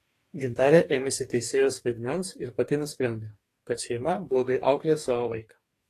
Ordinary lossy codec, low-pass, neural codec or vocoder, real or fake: AAC, 48 kbps; 14.4 kHz; codec, 44.1 kHz, 2.6 kbps, DAC; fake